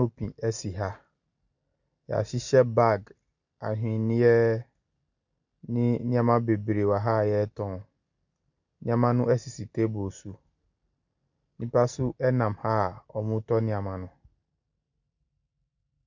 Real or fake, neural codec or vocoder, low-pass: real; none; 7.2 kHz